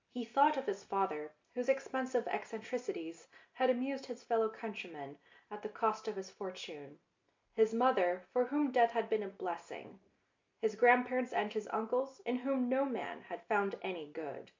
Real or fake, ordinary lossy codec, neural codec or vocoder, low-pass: real; MP3, 48 kbps; none; 7.2 kHz